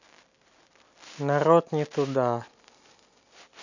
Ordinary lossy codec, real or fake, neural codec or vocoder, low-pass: none; real; none; 7.2 kHz